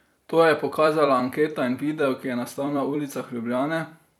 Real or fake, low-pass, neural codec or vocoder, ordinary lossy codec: fake; 19.8 kHz; vocoder, 44.1 kHz, 128 mel bands every 256 samples, BigVGAN v2; none